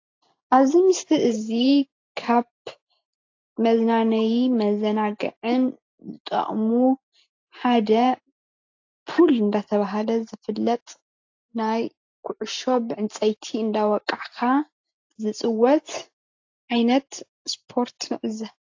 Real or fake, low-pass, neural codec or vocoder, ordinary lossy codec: real; 7.2 kHz; none; AAC, 48 kbps